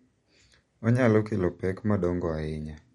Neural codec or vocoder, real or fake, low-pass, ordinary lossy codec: none; real; 19.8 kHz; MP3, 48 kbps